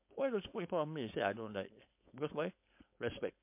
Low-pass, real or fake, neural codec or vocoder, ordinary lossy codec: 3.6 kHz; fake; codec, 16 kHz, 4.8 kbps, FACodec; MP3, 32 kbps